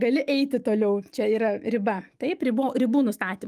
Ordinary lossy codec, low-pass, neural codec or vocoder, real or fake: Opus, 32 kbps; 14.4 kHz; autoencoder, 48 kHz, 128 numbers a frame, DAC-VAE, trained on Japanese speech; fake